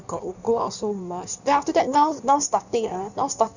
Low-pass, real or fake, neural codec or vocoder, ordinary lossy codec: 7.2 kHz; fake; codec, 16 kHz in and 24 kHz out, 1.1 kbps, FireRedTTS-2 codec; none